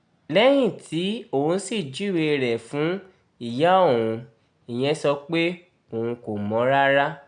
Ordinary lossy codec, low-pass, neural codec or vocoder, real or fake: none; 9.9 kHz; none; real